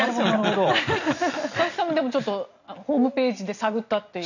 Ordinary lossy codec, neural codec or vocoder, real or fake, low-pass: none; none; real; 7.2 kHz